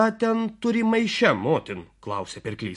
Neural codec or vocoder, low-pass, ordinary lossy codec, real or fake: none; 14.4 kHz; MP3, 48 kbps; real